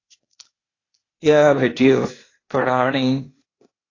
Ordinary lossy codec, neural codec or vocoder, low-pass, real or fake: AAC, 32 kbps; codec, 16 kHz, 0.8 kbps, ZipCodec; 7.2 kHz; fake